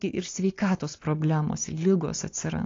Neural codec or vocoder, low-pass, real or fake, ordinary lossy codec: none; 7.2 kHz; real; AAC, 32 kbps